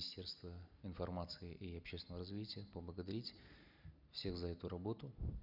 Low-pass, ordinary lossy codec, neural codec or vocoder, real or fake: 5.4 kHz; none; none; real